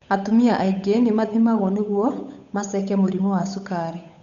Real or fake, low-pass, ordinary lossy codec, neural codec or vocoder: fake; 7.2 kHz; none; codec, 16 kHz, 8 kbps, FunCodec, trained on Chinese and English, 25 frames a second